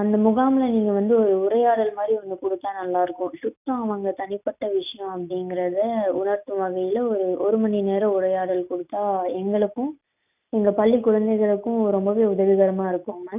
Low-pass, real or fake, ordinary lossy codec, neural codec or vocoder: 3.6 kHz; real; none; none